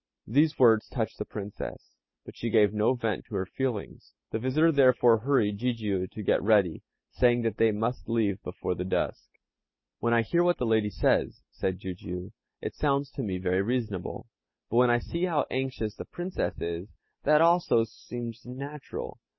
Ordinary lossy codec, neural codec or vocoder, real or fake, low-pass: MP3, 24 kbps; none; real; 7.2 kHz